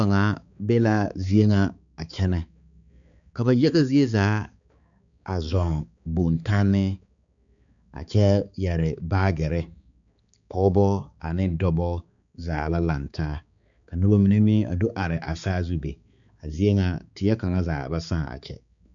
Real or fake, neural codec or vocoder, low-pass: fake; codec, 16 kHz, 4 kbps, X-Codec, HuBERT features, trained on balanced general audio; 7.2 kHz